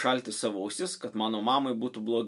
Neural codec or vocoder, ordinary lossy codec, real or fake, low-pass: none; MP3, 64 kbps; real; 10.8 kHz